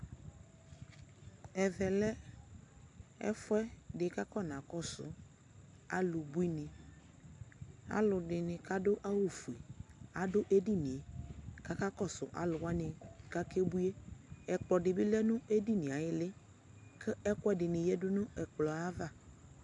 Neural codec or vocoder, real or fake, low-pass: none; real; 10.8 kHz